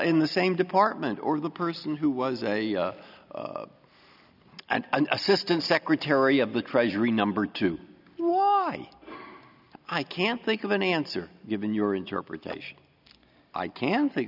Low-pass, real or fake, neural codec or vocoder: 5.4 kHz; real; none